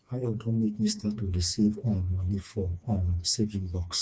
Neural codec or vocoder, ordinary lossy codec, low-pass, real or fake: codec, 16 kHz, 2 kbps, FreqCodec, smaller model; none; none; fake